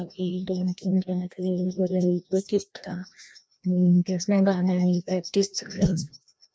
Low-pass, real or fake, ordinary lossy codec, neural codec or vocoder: none; fake; none; codec, 16 kHz, 1 kbps, FreqCodec, larger model